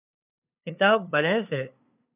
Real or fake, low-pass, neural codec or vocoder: fake; 3.6 kHz; codec, 16 kHz, 2 kbps, FunCodec, trained on LibriTTS, 25 frames a second